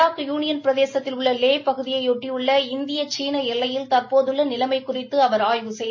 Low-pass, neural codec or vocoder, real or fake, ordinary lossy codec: 7.2 kHz; none; real; MP3, 32 kbps